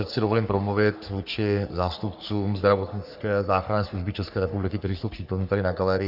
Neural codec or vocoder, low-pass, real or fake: codec, 44.1 kHz, 3.4 kbps, Pupu-Codec; 5.4 kHz; fake